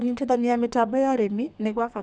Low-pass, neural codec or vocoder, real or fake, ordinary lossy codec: 9.9 kHz; codec, 32 kHz, 1.9 kbps, SNAC; fake; none